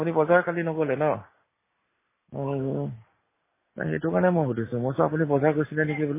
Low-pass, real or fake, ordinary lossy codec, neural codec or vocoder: 3.6 kHz; real; MP3, 16 kbps; none